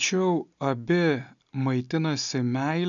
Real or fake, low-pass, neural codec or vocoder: real; 7.2 kHz; none